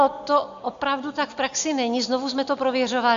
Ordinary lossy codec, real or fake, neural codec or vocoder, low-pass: MP3, 64 kbps; real; none; 7.2 kHz